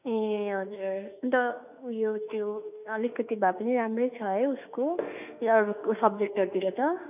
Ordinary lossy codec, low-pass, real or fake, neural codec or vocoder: none; 3.6 kHz; fake; autoencoder, 48 kHz, 32 numbers a frame, DAC-VAE, trained on Japanese speech